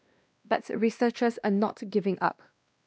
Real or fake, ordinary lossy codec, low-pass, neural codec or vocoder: fake; none; none; codec, 16 kHz, 1 kbps, X-Codec, WavLM features, trained on Multilingual LibriSpeech